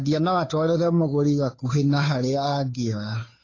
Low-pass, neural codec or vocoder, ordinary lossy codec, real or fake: 7.2 kHz; codec, 16 kHz, 2 kbps, FunCodec, trained on Chinese and English, 25 frames a second; AAC, 32 kbps; fake